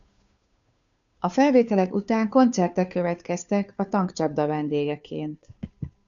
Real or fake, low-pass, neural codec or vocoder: fake; 7.2 kHz; codec, 16 kHz, 2 kbps, FunCodec, trained on Chinese and English, 25 frames a second